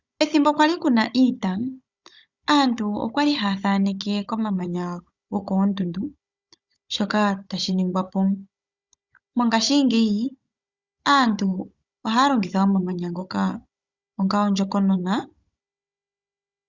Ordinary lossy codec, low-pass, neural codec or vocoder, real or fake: Opus, 64 kbps; 7.2 kHz; codec, 16 kHz, 16 kbps, FunCodec, trained on Chinese and English, 50 frames a second; fake